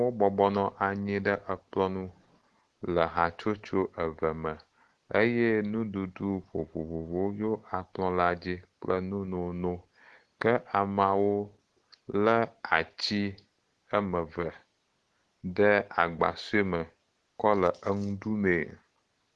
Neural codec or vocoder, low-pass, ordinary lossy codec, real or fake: none; 10.8 kHz; Opus, 16 kbps; real